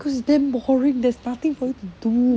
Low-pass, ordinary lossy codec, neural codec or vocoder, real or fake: none; none; none; real